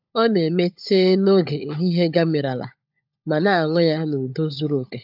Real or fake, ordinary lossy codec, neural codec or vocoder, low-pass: fake; AAC, 48 kbps; codec, 16 kHz, 16 kbps, FunCodec, trained on LibriTTS, 50 frames a second; 5.4 kHz